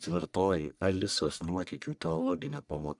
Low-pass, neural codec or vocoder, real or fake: 10.8 kHz; codec, 44.1 kHz, 1.7 kbps, Pupu-Codec; fake